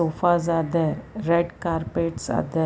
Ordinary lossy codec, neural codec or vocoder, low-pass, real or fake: none; none; none; real